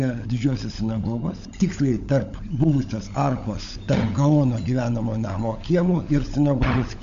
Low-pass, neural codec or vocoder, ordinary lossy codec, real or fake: 7.2 kHz; codec, 16 kHz, 16 kbps, FunCodec, trained on LibriTTS, 50 frames a second; MP3, 48 kbps; fake